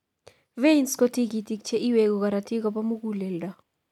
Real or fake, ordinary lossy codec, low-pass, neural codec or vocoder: real; none; 19.8 kHz; none